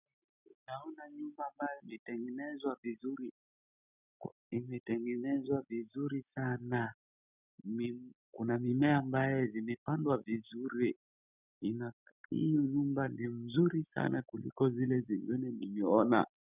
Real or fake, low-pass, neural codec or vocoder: real; 3.6 kHz; none